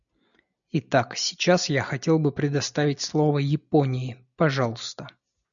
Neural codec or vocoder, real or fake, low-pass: none; real; 7.2 kHz